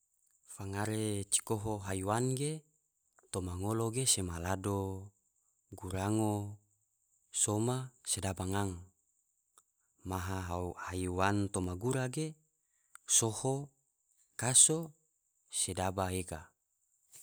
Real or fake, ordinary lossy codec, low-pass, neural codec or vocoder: real; none; none; none